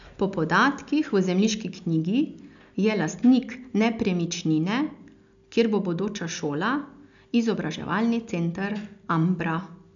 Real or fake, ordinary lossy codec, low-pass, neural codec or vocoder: real; none; 7.2 kHz; none